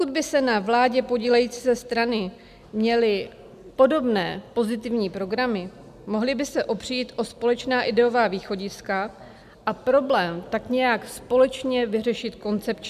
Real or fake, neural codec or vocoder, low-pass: real; none; 14.4 kHz